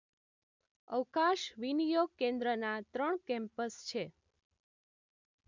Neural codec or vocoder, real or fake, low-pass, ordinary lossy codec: none; real; 7.2 kHz; MP3, 64 kbps